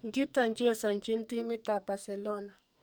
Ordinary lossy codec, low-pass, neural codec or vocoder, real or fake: none; none; codec, 44.1 kHz, 2.6 kbps, SNAC; fake